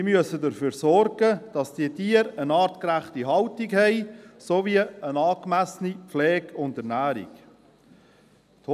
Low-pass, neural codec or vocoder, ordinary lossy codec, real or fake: 14.4 kHz; none; none; real